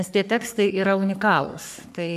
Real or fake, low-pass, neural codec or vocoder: fake; 14.4 kHz; codec, 44.1 kHz, 3.4 kbps, Pupu-Codec